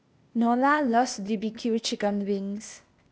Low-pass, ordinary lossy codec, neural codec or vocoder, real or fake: none; none; codec, 16 kHz, 0.8 kbps, ZipCodec; fake